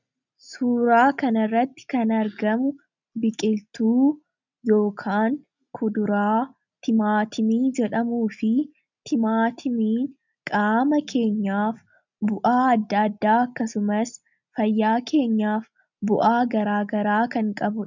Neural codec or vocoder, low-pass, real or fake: none; 7.2 kHz; real